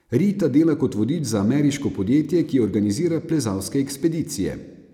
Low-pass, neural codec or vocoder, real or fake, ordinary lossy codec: 19.8 kHz; none; real; none